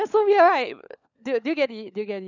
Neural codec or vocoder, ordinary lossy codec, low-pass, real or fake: codec, 16 kHz, 16 kbps, FunCodec, trained on LibriTTS, 50 frames a second; none; 7.2 kHz; fake